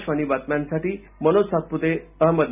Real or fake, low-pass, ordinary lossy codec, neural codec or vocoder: real; 3.6 kHz; none; none